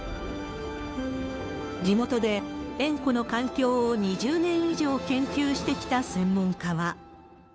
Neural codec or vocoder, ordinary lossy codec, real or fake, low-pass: codec, 16 kHz, 2 kbps, FunCodec, trained on Chinese and English, 25 frames a second; none; fake; none